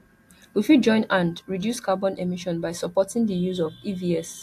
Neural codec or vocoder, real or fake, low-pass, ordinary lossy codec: none; real; 14.4 kHz; AAC, 64 kbps